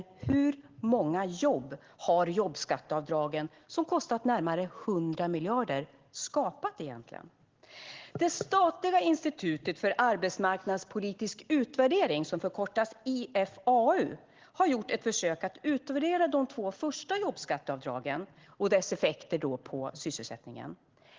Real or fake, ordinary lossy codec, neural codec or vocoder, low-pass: real; Opus, 16 kbps; none; 7.2 kHz